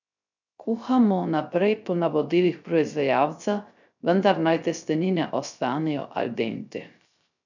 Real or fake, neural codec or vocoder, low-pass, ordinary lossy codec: fake; codec, 16 kHz, 0.3 kbps, FocalCodec; 7.2 kHz; none